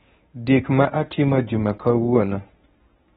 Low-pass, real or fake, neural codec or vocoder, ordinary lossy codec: 10.8 kHz; fake; codec, 24 kHz, 0.9 kbps, WavTokenizer, medium speech release version 1; AAC, 16 kbps